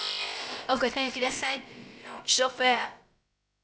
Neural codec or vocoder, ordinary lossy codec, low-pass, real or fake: codec, 16 kHz, about 1 kbps, DyCAST, with the encoder's durations; none; none; fake